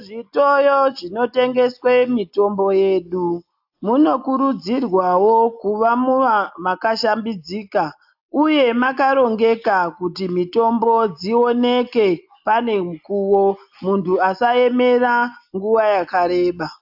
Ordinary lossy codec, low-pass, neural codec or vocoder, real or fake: AAC, 48 kbps; 5.4 kHz; none; real